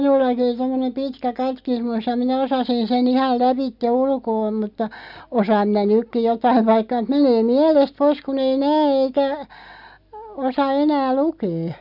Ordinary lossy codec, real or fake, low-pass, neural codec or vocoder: none; real; 5.4 kHz; none